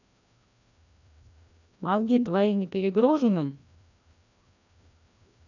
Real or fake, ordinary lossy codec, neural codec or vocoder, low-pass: fake; none; codec, 16 kHz, 1 kbps, FreqCodec, larger model; 7.2 kHz